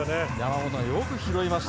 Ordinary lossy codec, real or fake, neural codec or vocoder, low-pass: none; real; none; none